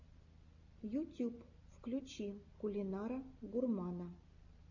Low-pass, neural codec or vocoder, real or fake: 7.2 kHz; none; real